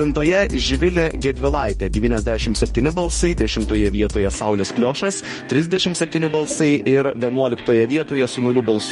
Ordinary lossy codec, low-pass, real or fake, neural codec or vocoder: MP3, 48 kbps; 19.8 kHz; fake; codec, 44.1 kHz, 2.6 kbps, DAC